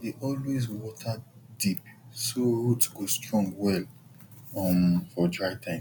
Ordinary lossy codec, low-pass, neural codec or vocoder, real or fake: none; 19.8 kHz; none; real